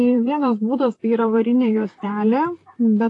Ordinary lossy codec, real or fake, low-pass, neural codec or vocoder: AAC, 48 kbps; fake; 10.8 kHz; vocoder, 44.1 kHz, 128 mel bands, Pupu-Vocoder